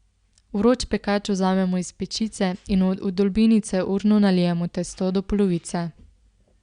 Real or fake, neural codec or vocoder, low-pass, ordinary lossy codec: real; none; 9.9 kHz; Opus, 64 kbps